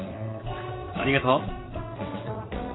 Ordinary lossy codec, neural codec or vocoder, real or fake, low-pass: AAC, 16 kbps; vocoder, 22.05 kHz, 80 mel bands, WaveNeXt; fake; 7.2 kHz